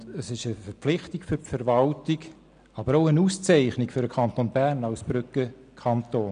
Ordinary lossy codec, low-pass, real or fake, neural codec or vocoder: none; 9.9 kHz; real; none